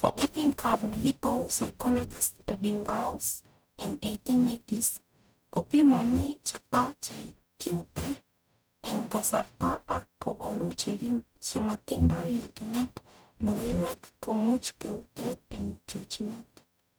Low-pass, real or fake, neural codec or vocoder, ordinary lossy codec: none; fake; codec, 44.1 kHz, 0.9 kbps, DAC; none